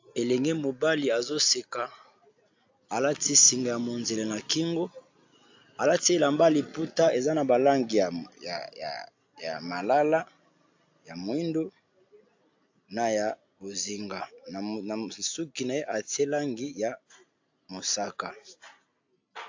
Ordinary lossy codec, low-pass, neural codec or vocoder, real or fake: AAC, 48 kbps; 7.2 kHz; none; real